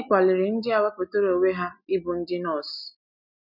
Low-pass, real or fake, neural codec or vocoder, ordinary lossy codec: 5.4 kHz; real; none; none